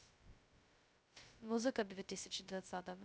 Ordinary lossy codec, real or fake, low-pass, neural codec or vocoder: none; fake; none; codec, 16 kHz, 0.2 kbps, FocalCodec